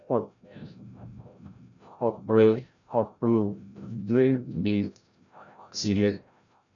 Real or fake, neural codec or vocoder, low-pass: fake; codec, 16 kHz, 0.5 kbps, FreqCodec, larger model; 7.2 kHz